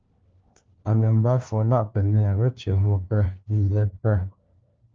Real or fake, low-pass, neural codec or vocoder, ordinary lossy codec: fake; 7.2 kHz; codec, 16 kHz, 1 kbps, FunCodec, trained on LibriTTS, 50 frames a second; Opus, 24 kbps